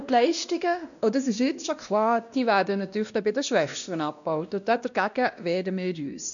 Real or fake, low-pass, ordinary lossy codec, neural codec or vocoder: fake; 7.2 kHz; none; codec, 16 kHz, 1 kbps, X-Codec, WavLM features, trained on Multilingual LibriSpeech